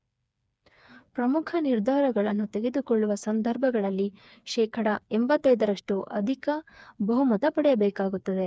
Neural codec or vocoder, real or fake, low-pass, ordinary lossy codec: codec, 16 kHz, 4 kbps, FreqCodec, smaller model; fake; none; none